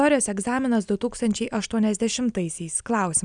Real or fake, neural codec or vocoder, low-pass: real; none; 9.9 kHz